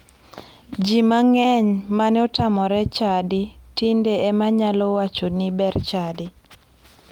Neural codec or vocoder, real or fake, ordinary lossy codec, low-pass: none; real; Opus, 32 kbps; 19.8 kHz